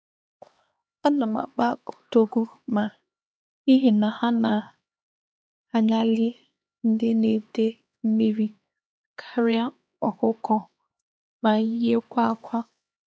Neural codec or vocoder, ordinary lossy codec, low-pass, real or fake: codec, 16 kHz, 2 kbps, X-Codec, HuBERT features, trained on LibriSpeech; none; none; fake